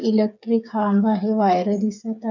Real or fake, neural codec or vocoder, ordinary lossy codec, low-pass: fake; codec, 16 kHz, 8 kbps, FreqCodec, smaller model; none; 7.2 kHz